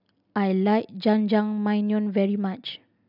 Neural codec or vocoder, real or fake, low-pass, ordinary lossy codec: none; real; 5.4 kHz; none